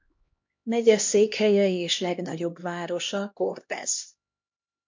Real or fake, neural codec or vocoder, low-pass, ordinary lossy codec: fake; codec, 16 kHz, 1 kbps, X-Codec, HuBERT features, trained on LibriSpeech; 7.2 kHz; MP3, 48 kbps